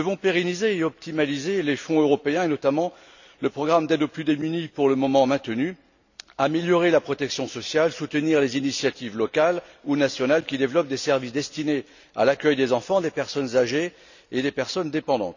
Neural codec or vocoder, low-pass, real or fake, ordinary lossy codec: none; 7.2 kHz; real; none